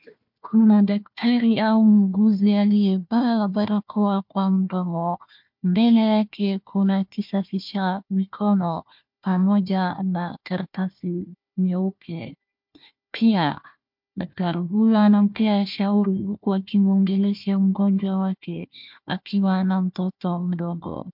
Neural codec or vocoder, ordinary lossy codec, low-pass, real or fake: codec, 16 kHz, 1 kbps, FunCodec, trained on Chinese and English, 50 frames a second; MP3, 48 kbps; 5.4 kHz; fake